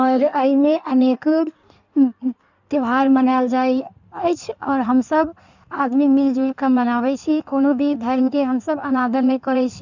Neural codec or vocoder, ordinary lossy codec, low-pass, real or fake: codec, 16 kHz in and 24 kHz out, 1.1 kbps, FireRedTTS-2 codec; none; 7.2 kHz; fake